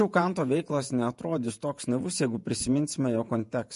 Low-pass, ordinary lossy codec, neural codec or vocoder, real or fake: 14.4 kHz; MP3, 48 kbps; vocoder, 48 kHz, 128 mel bands, Vocos; fake